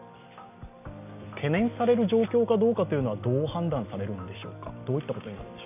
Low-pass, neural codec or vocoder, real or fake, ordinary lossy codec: 3.6 kHz; none; real; none